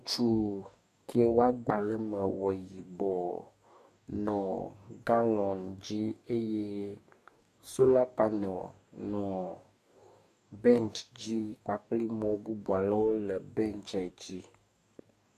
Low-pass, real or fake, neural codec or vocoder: 14.4 kHz; fake; codec, 44.1 kHz, 2.6 kbps, DAC